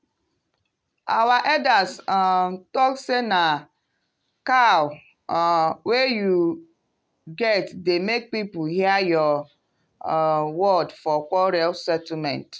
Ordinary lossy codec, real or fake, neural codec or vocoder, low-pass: none; real; none; none